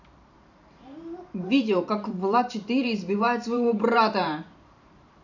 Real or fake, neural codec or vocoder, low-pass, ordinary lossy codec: fake; vocoder, 44.1 kHz, 128 mel bands every 512 samples, BigVGAN v2; 7.2 kHz; none